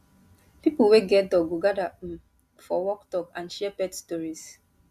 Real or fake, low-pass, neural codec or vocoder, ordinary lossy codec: real; 14.4 kHz; none; Opus, 64 kbps